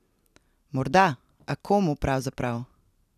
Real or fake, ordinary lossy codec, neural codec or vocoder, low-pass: real; none; none; 14.4 kHz